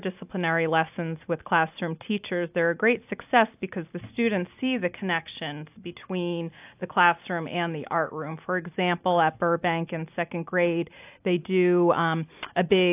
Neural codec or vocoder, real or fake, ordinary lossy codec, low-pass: none; real; AAC, 32 kbps; 3.6 kHz